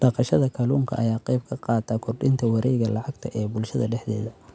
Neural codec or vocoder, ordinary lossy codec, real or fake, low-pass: none; none; real; none